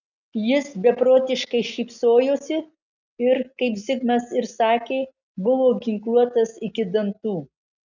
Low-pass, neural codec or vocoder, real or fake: 7.2 kHz; none; real